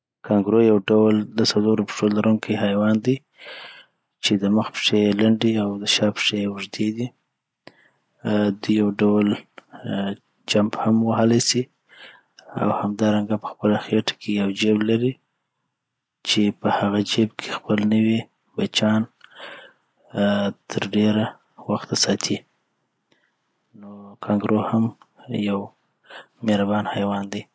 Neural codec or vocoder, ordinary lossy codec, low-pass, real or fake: none; none; none; real